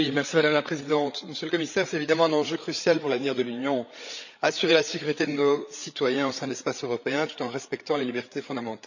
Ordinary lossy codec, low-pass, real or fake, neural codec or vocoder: none; 7.2 kHz; fake; codec, 16 kHz, 8 kbps, FreqCodec, larger model